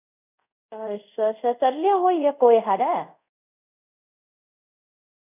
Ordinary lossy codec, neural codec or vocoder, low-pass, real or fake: none; codec, 24 kHz, 0.5 kbps, DualCodec; 3.6 kHz; fake